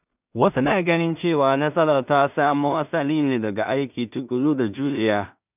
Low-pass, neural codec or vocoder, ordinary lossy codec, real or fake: 3.6 kHz; codec, 16 kHz in and 24 kHz out, 0.4 kbps, LongCat-Audio-Codec, two codebook decoder; none; fake